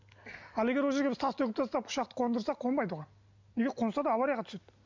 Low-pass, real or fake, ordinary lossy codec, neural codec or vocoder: 7.2 kHz; real; MP3, 64 kbps; none